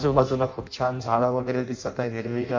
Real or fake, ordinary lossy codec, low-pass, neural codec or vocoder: fake; MP3, 48 kbps; 7.2 kHz; codec, 16 kHz in and 24 kHz out, 0.6 kbps, FireRedTTS-2 codec